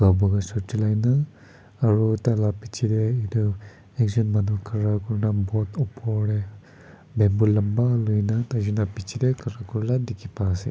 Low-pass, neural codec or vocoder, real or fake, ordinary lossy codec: none; none; real; none